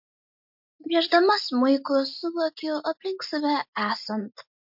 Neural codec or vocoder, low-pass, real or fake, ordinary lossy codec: none; 5.4 kHz; real; MP3, 48 kbps